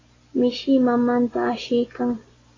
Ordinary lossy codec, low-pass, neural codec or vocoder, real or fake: AAC, 32 kbps; 7.2 kHz; none; real